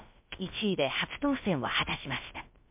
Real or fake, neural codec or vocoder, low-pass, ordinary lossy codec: fake; codec, 16 kHz, about 1 kbps, DyCAST, with the encoder's durations; 3.6 kHz; MP3, 32 kbps